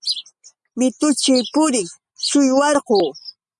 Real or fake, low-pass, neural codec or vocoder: real; 10.8 kHz; none